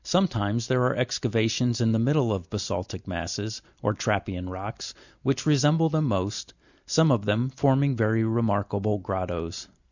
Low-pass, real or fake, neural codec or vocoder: 7.2 kHz; real; none